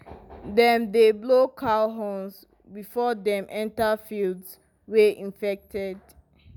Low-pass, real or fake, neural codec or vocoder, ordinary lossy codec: 19.8 kHz; real; none; none